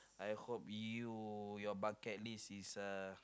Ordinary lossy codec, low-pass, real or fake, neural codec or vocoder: none; none; real; none